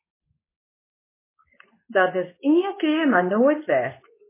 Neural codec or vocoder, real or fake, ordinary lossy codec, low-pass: codec, 24 kHz, 0.9 kbps, WavTokenizer, medium speech release version 2; fake; MP3, 16 kbps; 3.6 kHz